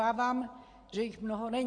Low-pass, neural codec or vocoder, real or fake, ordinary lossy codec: 9.9 kHz; none; real; AAC, 48 kbps